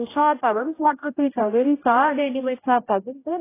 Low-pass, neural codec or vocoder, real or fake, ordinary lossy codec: 3.6 kHz; codec, 16 kHz, 0.5 kbps, X-Codec, HuBERT features, trained on general audio; fake; AAC, 16 kbps